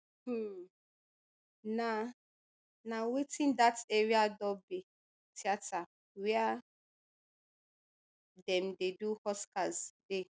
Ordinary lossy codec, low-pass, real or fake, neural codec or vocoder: none; none; real; none